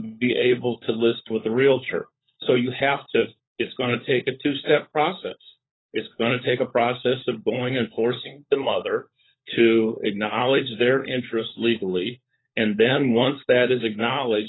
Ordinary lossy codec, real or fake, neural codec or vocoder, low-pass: AAC, 16 kbps; fake; codec, 16 kHz, 16 kbps, FunCodec, trained on LibriTTS, 50 frames a second; 7.2 kHz